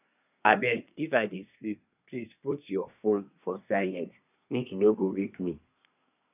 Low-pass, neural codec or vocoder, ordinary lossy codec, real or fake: 3.6 kHz; codec, 24 kHz, 1 kbps, SNAC; none; fake